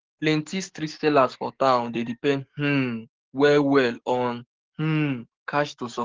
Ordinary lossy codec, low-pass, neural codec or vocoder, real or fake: Opus, 16 kbps; 7.2 kHz; codec, 44.1 kHz, 7.8 kbps, DAC; fake